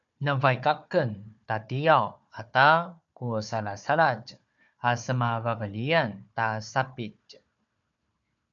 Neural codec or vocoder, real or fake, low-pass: codec, 16 kHz, 4 kbps, FunCodec, trained on Chinese and English, 50 frames a second; fake; 7.2 kHz